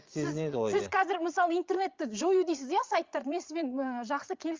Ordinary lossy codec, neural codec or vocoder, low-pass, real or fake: Opus, 32 kbps; vocoder, 22.05 kHz, 80 mel bands, Vocos; 7.2 kHz; fake